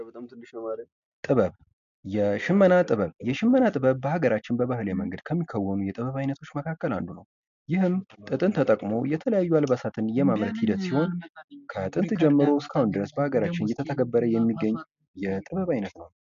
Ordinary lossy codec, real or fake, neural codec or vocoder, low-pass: MP3, 64 kbps; real; none; 7.2 kHz